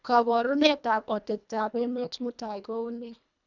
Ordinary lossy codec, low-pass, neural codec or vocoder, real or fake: none; 7.2 kHz; codec, 24 kHz, 1.5 kbps, HILCodec; fake